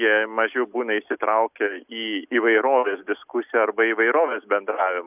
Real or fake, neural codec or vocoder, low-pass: real; none; 3.6 kHz